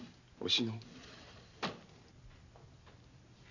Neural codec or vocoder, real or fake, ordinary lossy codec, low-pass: none; real; none; 7.2 kHz